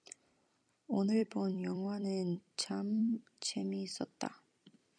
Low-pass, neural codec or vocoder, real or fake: 9.9 kHz; vocoder, 44.1 kHz, 128 mel bands every 256 samples, BigVGAN v2; fake